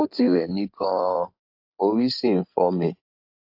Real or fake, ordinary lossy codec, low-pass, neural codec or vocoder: fake; AAC, 48 kbps; 5.4 kHz; codec, 16 kHz in and 24 kHz out, 2.2 kbps, FireRedTTS-2 codec